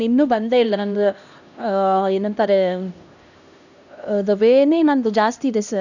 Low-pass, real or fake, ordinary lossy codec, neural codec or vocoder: 7.2 kHz; fake; none; codec, 16 kHz, 1 kbps, X-Codec, HuBERT features, trained on LibriSpeech